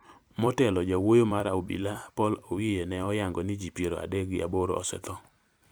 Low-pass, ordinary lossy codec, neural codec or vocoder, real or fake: none; none; vocoder, 44.1 kHz, 128 mel bands every 256 samples, BigVGAN v2; fake